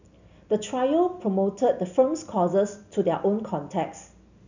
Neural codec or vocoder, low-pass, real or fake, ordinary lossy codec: none; 7.2 kHz; real; none